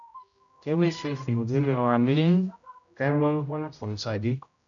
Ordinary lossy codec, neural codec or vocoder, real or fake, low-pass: MP3, 96 kbps; codec, 16 kHz, 0.5 kbps, X-Codec, HuBERT features, trained on general audio; fake; 7.2 kHz